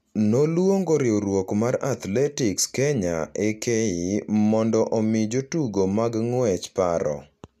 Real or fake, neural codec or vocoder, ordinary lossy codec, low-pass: real; none; none; 14.4 kHz